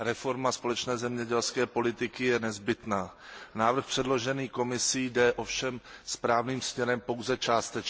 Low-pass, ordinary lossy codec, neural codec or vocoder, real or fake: none; none; none; real